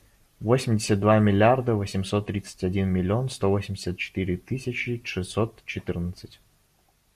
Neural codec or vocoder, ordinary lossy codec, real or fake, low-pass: none; AAC, 96 kbps; real; 14.4 kHz